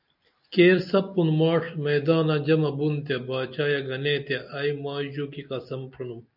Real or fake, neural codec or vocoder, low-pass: real; none; 5.4 kHz